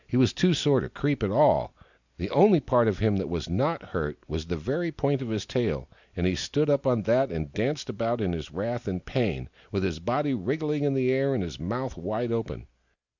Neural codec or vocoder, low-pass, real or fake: none; 7.2 kHz; real